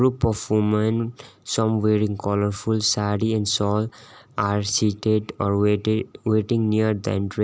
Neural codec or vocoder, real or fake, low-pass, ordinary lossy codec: none; real; none; none